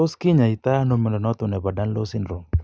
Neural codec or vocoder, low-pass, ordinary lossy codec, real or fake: none; none; none; real